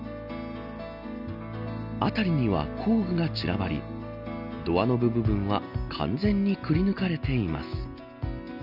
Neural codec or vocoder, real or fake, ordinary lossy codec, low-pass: none; real; none; 5.4 kHz